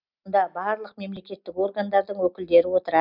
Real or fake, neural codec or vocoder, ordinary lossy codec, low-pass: real; none; none; 5.4 kHz